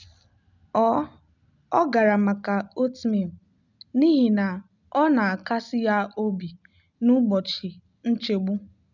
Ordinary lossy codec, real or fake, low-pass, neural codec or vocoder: none; real; 7.2 kHz; none